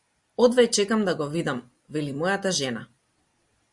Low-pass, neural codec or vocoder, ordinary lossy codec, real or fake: 10.8 kHz; none; Opus, 64 kbps; real